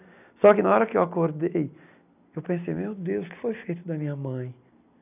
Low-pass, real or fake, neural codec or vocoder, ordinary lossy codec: 3.6 kHz; real; none; none